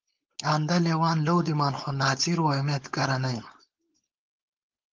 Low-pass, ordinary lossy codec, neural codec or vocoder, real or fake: 7.2 kHz; Opus, 24 kbps; codec, 16 kHz, 4.8 kbps, FACodec; fake